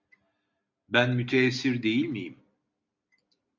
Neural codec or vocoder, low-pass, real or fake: none; 7.2 kHz; real